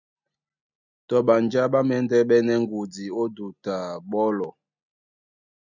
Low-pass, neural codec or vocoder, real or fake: 7.2 kHz; none; real